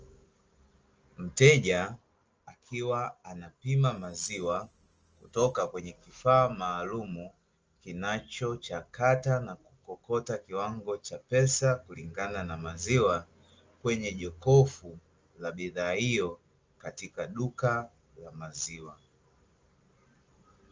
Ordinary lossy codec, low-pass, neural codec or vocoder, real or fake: Opus, 24 kbps; 7.2 kHz; none; real